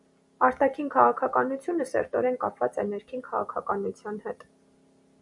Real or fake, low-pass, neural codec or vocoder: real; 10.8 kHz; none